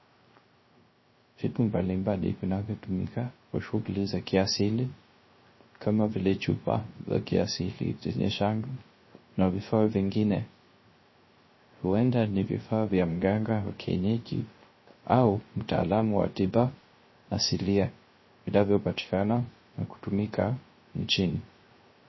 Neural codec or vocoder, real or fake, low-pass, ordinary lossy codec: codec, 16 kHz, 0.3 kbps, FocalCodec; fake; 7.2 kHz; MP3, 24 kbps